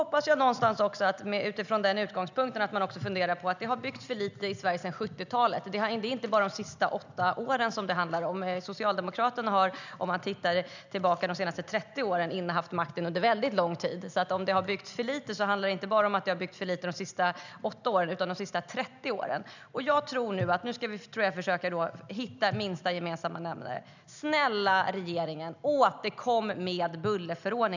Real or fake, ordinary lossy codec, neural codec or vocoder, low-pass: real; none; none; 7.2 kHz